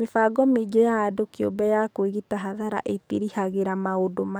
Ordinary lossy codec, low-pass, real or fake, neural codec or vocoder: none; none; fake; codec, 44.1 kHz, 7.8 kbps, DAC